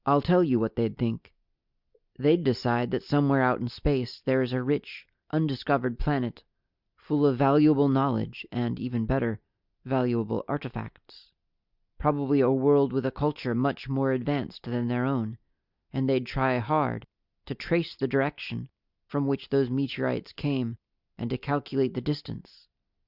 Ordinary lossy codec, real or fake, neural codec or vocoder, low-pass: Opus, 64 kbps; real; none; 5.4 kHz